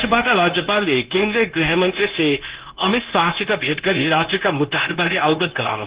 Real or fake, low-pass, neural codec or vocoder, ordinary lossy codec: fake; 3.6 kHz; codec, 16 kHz, 0.9 kbps, LongCat-Audio-Codec; Opus, 16 kbps